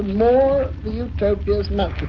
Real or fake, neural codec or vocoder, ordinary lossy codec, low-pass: real; none; AAC, 48 kbps; 7.2 kHz